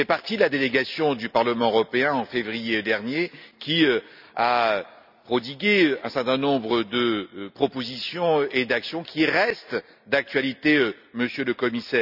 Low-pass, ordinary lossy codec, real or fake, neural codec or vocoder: 5.4 kHz; none; real; none